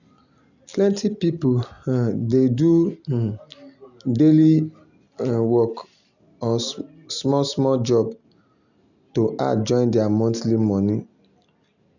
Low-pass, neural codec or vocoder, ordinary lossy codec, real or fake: 7.2 kHz; none; none; real